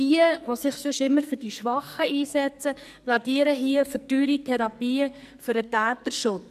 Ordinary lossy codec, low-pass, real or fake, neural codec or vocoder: none; 14.4 kHz; fake; codec, 44.1 kHz, 2.6 kbps, SNAC